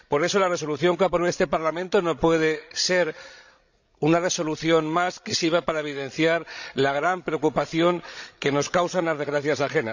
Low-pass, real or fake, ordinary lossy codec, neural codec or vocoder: 7.2 kHz; fake; none; codec, 16 kHz, 16 kbps, FreqCodec, larger model